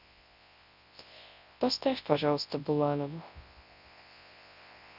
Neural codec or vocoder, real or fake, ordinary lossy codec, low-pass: codec, 24 kHz, 0.9 kbps, WavTokenizer, large speech release; fake; none; 5.4 kHz